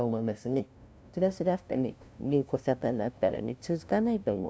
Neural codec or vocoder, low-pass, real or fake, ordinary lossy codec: codec, 16 kHz, 0.5 kbps, FunCodec, trained on LibriTTS, 25 frames a second; none; fake; none